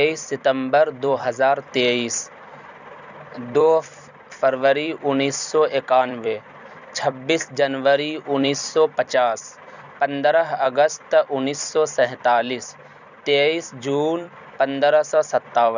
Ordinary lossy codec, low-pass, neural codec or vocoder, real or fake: none; 7.2 kHz; vocoder, 44.1 kHz, 128 mel bands every 256 samples, BigVGAN v2; fake